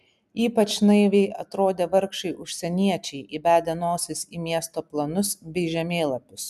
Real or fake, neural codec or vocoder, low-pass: real; none; 14.4 kHz